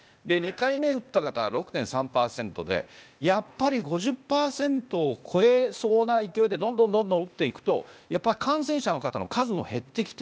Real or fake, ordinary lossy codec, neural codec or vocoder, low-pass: fake; none; codec, 16 kHz, 0.8 kbps, ZipCodec; none